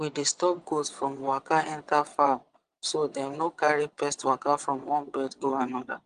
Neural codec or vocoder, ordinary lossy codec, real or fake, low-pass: vocoder, 22.05 kHz, 80 mel bands, WaveNeXt; Opus, 24 kbps; fake; 9.9 kHz